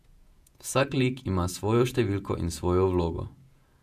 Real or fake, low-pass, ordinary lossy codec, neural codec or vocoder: fake; 14.4 kHz; none; vocoder, 48 kHz, 128 mel bands, Vocos